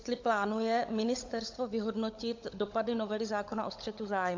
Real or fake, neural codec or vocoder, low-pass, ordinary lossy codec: fake; codec, 16 kHz, 16 kbps, FunCodec, trained on LibriTTS, 50 frames a second; 7.2 kHz; AAC, 48 kbps